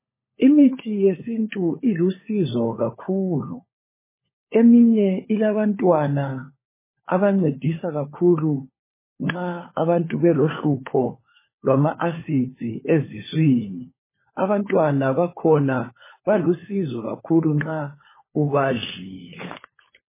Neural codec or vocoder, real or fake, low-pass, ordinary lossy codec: codec, 16 kHz, 4 kbps, FunCodec, trained on LibriTTS, 50 frames a second; fake; 3.6 kHz; MP3, 16 kbps